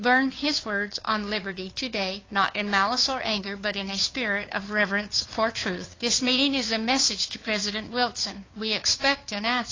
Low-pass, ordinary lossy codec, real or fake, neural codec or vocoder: 7.2 kHz; AAC, 32 kbps; fake; codec, 16 kHz, 2 kbps, FunCodec, trained on Chinese and English, 25 frames a second